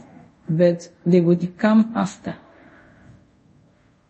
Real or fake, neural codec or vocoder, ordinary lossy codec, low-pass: fake; codec, 24 kHz, 0.5 kbps, DualCodec; MP3, 32 kbps; 10.8 kHz